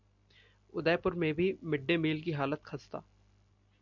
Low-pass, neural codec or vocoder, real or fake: 7.2 kHz; none; real